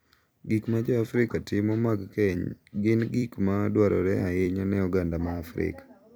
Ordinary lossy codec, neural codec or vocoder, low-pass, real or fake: none; vocoder, 44.1 kHz, 128 mel bands every 256 samples, BigVGAN v2; none; fake